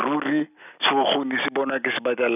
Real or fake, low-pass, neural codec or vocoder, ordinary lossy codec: real; 3.6 kHz; none; none